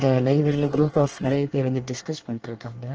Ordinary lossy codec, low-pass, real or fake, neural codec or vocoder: Opus, 16 kbps; 7.2 kHz; fake; codec, 24 kHz, 1 kbps, SNAC